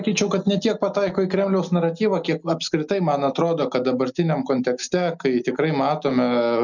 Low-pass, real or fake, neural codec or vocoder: 7.2 kHz; real; none